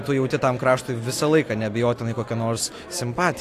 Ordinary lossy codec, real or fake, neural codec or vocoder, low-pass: AAC, 64 kbps; real; none; 14.4 kHz